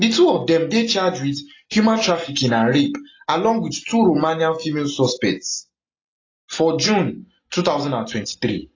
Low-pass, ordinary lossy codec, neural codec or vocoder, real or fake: 7.2 kHz; AAC, 32 kbps; none; real